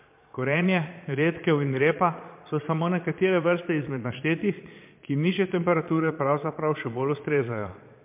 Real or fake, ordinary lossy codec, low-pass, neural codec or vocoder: fake; MP3, 32 kbps; 3.6 kHz; vocoder, 22.05 kHz, 80 mel bands, Vocos